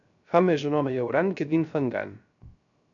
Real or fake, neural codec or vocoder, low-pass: fake; codec, 16 kHz, 0.3 kbps, FocalCodec; 7.2 kHz